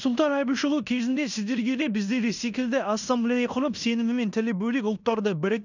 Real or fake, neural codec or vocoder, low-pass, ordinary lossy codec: fake; codec, 16 kHz in and 24 kHz out, 0.9 kbps, LongCat-Audio-Codec, fine tuned four codebook decoder; 7.2 kHz; none